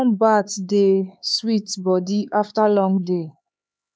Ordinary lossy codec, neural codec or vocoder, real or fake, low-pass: none; codec, 16 kHz, 4 kbps, X-Codec, HuBERT features, trained on LibriSpeech; fake; none